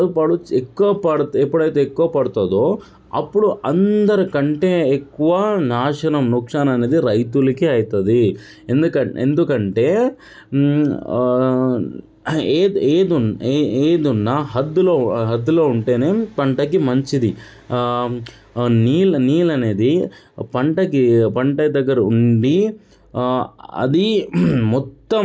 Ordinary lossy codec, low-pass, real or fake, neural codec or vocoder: none; none; real; none